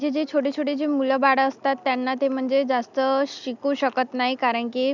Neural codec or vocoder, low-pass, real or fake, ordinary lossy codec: none; 7.2 kHz; real; none